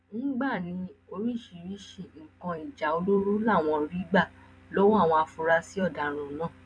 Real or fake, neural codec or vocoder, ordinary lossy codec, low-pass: fake; vocoder, 44.1 kHz, 128 mel bands every 256 samples, BigVGAN v2; none; 9.9 kHz